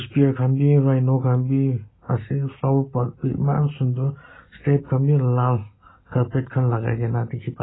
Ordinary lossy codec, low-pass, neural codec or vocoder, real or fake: AAC, 16 kbps; 7.2 kHz; none; real